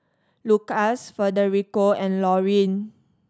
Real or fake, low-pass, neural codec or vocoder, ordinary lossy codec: real; none; none; none